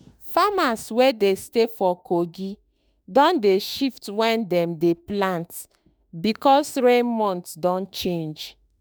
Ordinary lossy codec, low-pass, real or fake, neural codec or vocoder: none; none; fake; autoencoder, 48 kHz, 32 numbers a frame, DAC-VAE, trained on Japanese speech